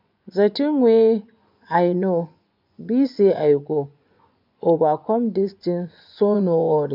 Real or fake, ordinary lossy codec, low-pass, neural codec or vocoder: fake; MP3, 48 kbps; 5.4 kHz; vocoder, 24 kHz, 100 mel bands, Vocos